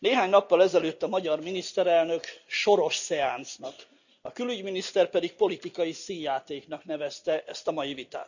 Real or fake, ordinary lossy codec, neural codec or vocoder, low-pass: real; none; none; 7.2 kHz